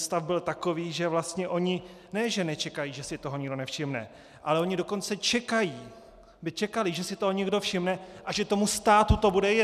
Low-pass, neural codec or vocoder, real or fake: 14.4 kHz; none; real